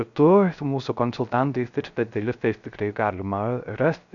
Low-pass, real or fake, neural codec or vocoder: 7.2 kHz; fake; codec, 16 kHz, 0.3 kbps, FocalCodec